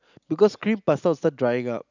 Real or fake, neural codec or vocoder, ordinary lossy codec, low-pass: real; none; none; 7.2 kHz